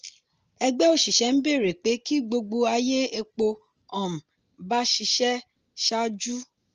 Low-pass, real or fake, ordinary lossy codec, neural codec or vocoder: 7.2 kHz; real; Opus, 24 kbps; none